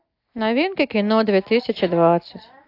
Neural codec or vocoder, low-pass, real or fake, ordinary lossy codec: none; 5.4 kHz; real; AAC, 32 kbps